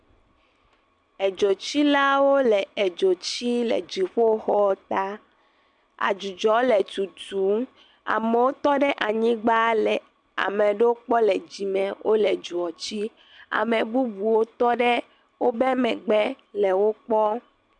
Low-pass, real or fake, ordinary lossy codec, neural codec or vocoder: 9.9 kHz; real; MP3, 96 kbps; none